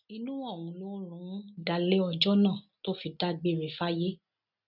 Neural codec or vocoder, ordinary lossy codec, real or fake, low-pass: none; none; real; 5.4 kHz